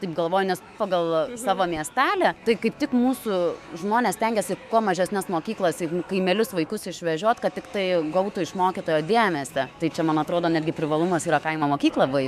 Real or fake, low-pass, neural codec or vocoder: fake; 14.4 kHz; autoencoder, 48 kHz, 128 numbers a frame, DAC-VAE, trained on Japanese speech